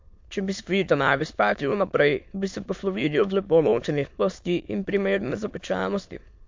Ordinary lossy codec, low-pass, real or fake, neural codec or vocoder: MP3, 48 kbps; 7.2 kHz; fake; autoencoder, 22.05 kHz, a latent of 192 numbers a frame, VITS, trained on many speakers